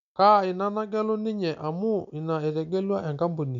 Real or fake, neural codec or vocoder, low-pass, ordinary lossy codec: real; none; 7.2 kHz; none